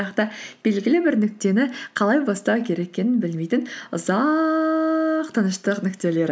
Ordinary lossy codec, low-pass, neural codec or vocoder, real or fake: none; none; none; real